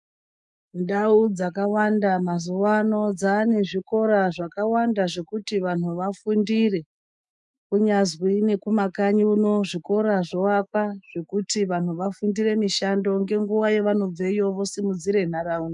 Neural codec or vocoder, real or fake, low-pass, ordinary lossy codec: autoencoder, 48 kHz, 128 numbers a frame, DAC-VAE, trained on Japanese speech; fake; 10.8 kHz; MP3, 96 kbps